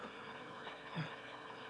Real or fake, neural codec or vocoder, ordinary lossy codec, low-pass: fake; autoencoder, 22.05 kHz, a latent of 192 numbers a frame, VITS, trained on one speaker; none; none